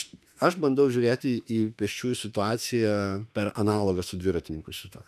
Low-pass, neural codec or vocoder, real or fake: 14.4 kHz; autoencoder, 48 kHz, 32 numbers a frame, DAC-VAE, trained on Japanese speech; fake